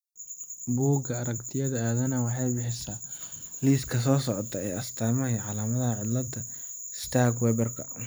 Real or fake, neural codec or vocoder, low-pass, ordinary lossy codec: fake; vocoder, 44.1 kHz, 128 mel bands every 256 samples, BigVGAN v2; none; none